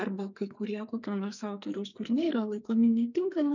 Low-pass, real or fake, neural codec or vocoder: 7.2 kHz; fake; codec, 44.1 kHz, 2.6 kbps, SNAC